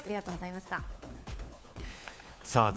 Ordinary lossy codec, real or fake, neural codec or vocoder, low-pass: none; fake; codec, 16 kHz, 4 kbps, FunCodec, trained on LibriTTS, 50 frames a second; none